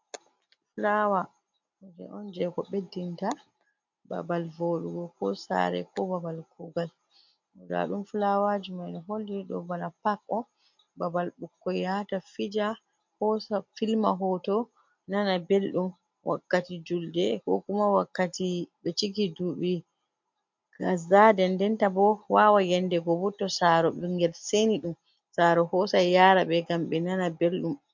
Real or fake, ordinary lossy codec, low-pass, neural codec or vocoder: real; MP3, 48 kbps; 7.2 kHz; none